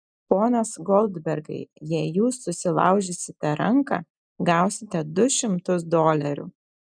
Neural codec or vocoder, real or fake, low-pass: none; real; 9.9 kHz